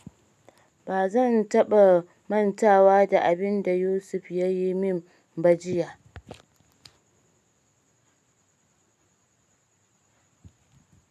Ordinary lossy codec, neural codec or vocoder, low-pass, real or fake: none; none; 14.4 kHz; real